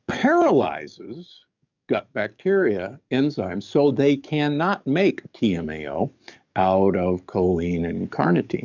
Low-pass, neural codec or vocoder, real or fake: 7.2 kHz; codec, 44.1 kHz, 7.8 kbps, DAC; fake